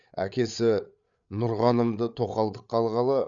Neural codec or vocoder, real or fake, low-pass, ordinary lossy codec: codec, 16 kHz, 8 kbps, FreqCodec, larger model; fake; 7.2 kHz; none